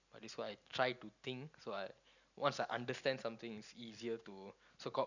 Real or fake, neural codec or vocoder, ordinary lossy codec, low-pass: real; none; none; 7.2 kHz